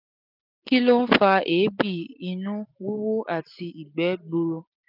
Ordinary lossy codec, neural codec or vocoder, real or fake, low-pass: none; none; real; 5.4 kHz